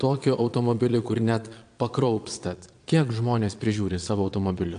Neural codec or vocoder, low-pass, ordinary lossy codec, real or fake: vocoder, 22.05 kHz, 80 mel bands, Vocos; 9.9 kHz; AAC, 64 kbps; fake